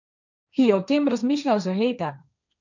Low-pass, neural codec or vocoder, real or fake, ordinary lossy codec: 7.2 kHz; codec, 16 kHz, 1.1 kbps, Voila-Tokenizer; fake; none